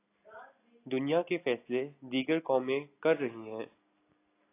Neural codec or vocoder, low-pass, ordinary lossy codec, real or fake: none; 3.6 kHz; AAC, 24 kbps; real